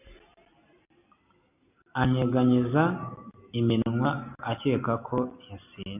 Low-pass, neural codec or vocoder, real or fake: 3.6 kHz; none; real